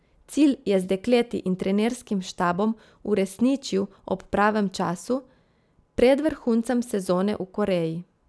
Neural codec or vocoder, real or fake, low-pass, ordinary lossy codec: none; real; none; none